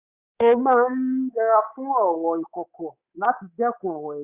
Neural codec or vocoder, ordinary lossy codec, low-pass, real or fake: codec, 24 kHz, 3.1 kbps, DualCodec; Opus, 64 kbps; 3.6 kHz; fake